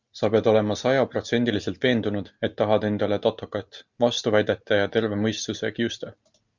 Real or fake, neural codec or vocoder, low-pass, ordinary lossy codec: real; none; 7.2 kHz; Opus, 64 kbps